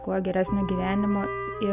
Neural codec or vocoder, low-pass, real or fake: none; 3.6 kHz; real